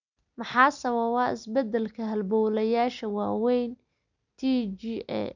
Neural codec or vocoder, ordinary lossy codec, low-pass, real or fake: none; none; 7.2 kHz; real